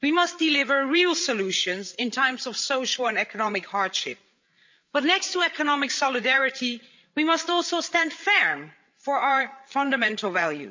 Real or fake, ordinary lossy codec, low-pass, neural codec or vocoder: fake; MP3, 64 kbps; 7.2 kHz; codec, 16 kHz, 8 kbps, FreqCodec, larger model